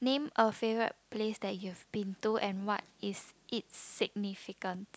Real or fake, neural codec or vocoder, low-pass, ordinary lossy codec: real; none; none; none